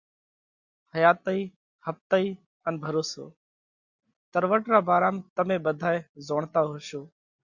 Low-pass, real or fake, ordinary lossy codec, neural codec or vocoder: 7.2 kHz; real; Opus, 64 kbps; none